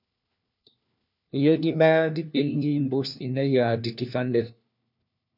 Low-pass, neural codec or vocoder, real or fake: 5.4 kHz; codec, 16 kHz, 1 kbps, FunCodec, trained on LibriTTS, 50 frames a second; fake